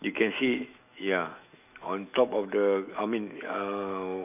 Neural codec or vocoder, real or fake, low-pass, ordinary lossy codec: autoencoder, 48 kHz, 128 numbers a frame, DAC-VAE, trained on Japanese speech; fake; 3.6 kHz; none